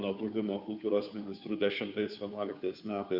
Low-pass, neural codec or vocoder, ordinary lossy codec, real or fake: 5.4 kHz; codec, 16 kHz, 2 kbps, FunCodec, trained on Chinese and English, 25 frames a second; Opus, 64 kbps; fake